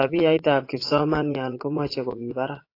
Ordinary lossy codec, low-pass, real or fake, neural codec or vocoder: AAC, 32 kbps; 5.4 kHz; fake; vocoder, 22.05 kHz, 80 mel bands, Vocos